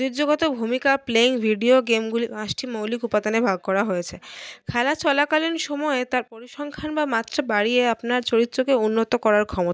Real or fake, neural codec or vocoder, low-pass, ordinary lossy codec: real; none; none; none